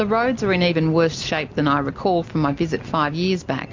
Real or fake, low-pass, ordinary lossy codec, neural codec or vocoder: real; 7.2 kHz; MP3, 48 kbps; none